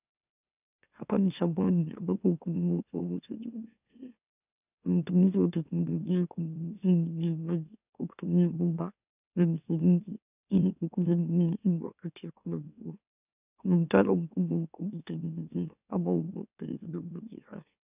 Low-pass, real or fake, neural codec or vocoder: 3.6 kHz; fake; autoencoder, 44.1 kHz, a latent of 192 numbers a frame, MeloTTS